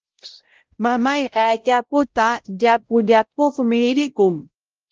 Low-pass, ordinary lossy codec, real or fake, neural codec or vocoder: 7.2 kHz; Opus, 16 kbps; fake; codec, 16 kHz, 0.5 kbps, X-Codec, WavLM features, trained on Multilingual LibriSpeech